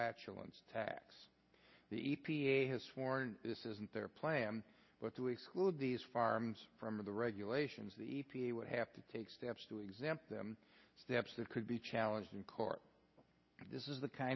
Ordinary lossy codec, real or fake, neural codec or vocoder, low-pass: MP3, 24 kbps; real; none; 7.2 kHz